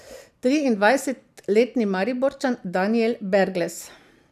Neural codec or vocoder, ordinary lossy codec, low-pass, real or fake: none; none; 14.4 kHz; real